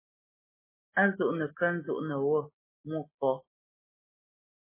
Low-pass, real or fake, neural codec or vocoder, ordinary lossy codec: 3.6 kHz; real; none; MP3, 16 kbps